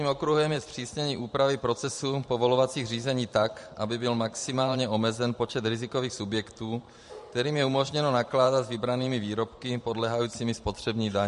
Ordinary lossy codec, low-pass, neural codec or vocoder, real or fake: MP3, 48 kbps; 14.4 kHz; vocoder, 44.1 kHz, 128 mel bands every 512 samples, BigVGAN v2; fake